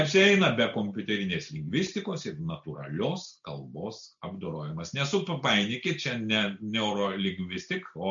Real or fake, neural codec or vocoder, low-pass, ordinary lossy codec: real; none; 7.2 kHz; AAC, 64 kbps